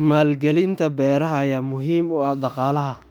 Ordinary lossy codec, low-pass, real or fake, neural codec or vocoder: none; 19.8 kHz; fake; autoencoder, 48 kHz, 32 numbers a frame, DAC-VAE, trained on Japanese speech